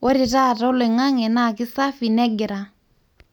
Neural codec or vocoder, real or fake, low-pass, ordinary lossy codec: none; real; 19.8 kHz; none